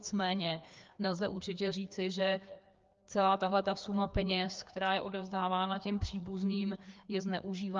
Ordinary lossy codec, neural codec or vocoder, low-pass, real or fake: Opus, 24 kbps; codec, 16 kHz, 2 kbps, FreqCodec, larger model; 7.2 kHz; fake